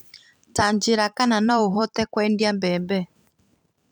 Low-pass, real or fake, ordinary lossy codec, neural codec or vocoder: 19.8 kHz; fake; none; vocoder, 44.1 kHz, 128 mel bands every 512 samples, BigVGAN v2